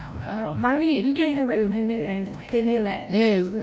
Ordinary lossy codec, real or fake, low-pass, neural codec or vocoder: none; fake; none; codec, 16 kHz, 0.5 kbps, FreqCodec, larger model